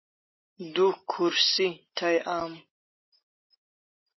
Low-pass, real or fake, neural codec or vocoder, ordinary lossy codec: 7.2 kHz; real; none; MP3, 24 kbps